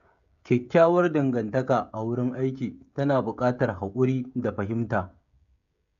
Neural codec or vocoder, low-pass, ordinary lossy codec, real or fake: codec, 16 kHz, 16 kbps, FreqCodec, smaller model; 7.2 kHz; none; fake